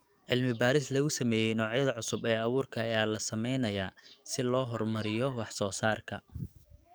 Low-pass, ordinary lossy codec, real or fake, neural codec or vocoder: none; none; fake; codec, 44.1 kHz, 7.8 kbps, DAC